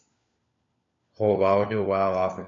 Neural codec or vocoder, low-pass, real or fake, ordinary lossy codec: codec, 16 kHz, 4 kbps, FunCodec, trained on LibriTTS, 50 frames a second; 7.2 kHz; fake; MP3, 48 kbps